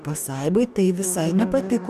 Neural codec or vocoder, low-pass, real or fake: codec, 44.1 kHz, 2.6 kbps, DAC; 14.4 kHz; fake